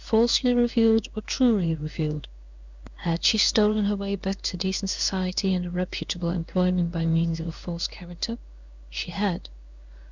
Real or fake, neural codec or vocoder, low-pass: fake; codec, 16 kHz in and 24 kHz out, 1 kbps, XY-Tokenizer; 7.2 kHz